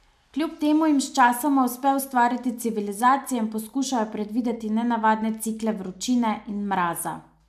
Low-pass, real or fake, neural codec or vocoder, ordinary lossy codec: 14.4 kHz; real; none; MP3, 96 kbps